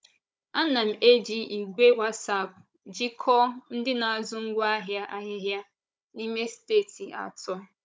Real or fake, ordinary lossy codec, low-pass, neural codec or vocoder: fake; none; none; codec, 16 kHz, 16 kbps, FunCodec, trained on Chinese and English, 50 frames a second